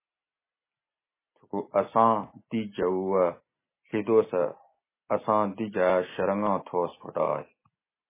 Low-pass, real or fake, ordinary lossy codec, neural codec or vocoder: 3.6 kHz; real; MP3, 16 kbps; none